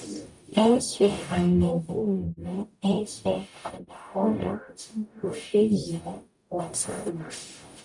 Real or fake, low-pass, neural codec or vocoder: fake; 10.8 kHz; codec, 44.1 kHz, 0.9 kbps, DAC